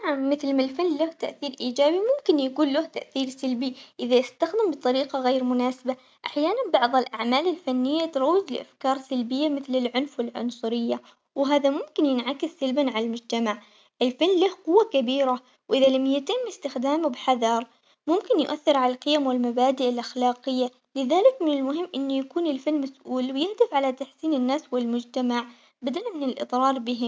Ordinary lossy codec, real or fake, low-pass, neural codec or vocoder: none; real; none; none